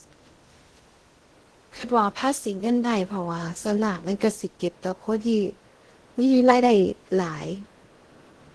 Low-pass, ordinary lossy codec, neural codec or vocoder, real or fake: 10.8 kHz; Opus, 16 kbps; codec, 16 kHz in and 24 kHz out, 0.6 kbps, FocalCodec, streaming, 2048 codes; fake